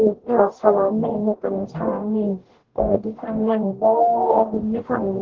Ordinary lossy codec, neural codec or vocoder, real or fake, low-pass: Opus, 16 kbps; codec, 44.1 kHz, 0.9 kbps, DAC; fake; 7.2 kHz